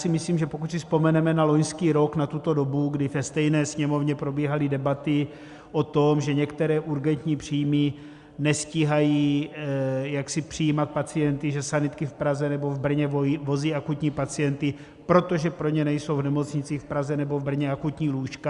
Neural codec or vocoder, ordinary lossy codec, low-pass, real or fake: none; Opus, 64 kbps; 10.8 kHz; real